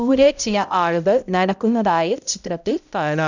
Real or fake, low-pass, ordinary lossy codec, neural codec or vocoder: fake; 7.2 kHz; none; codec, 16 kHz, 0.5 kbps, X-Codec, HuBERT features, trained on balanced general audio